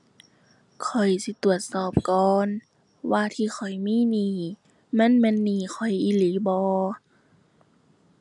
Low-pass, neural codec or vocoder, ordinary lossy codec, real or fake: 10.8 kHz; none; none; real